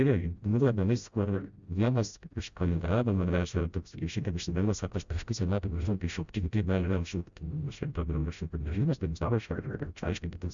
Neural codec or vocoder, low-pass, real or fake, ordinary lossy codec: codec, 16 kHz, 0.5 kbps, FreqCodec, smaller model; 7.2 kHz; fake; Opus, 64 kbps